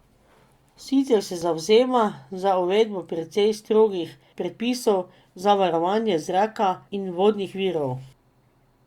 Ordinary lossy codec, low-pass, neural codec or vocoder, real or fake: Opus, 64 kbps; 19.8 kHz; none; real